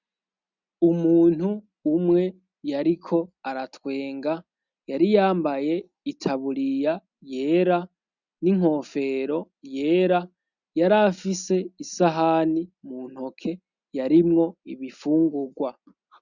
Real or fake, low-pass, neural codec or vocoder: real; 7.2 kHz; none